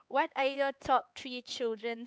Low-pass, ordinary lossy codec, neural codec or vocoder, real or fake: none; none; codec, 16 kHz, 4 kbps, X-Codec, HuBERT features, trained on LibriSpeech; fake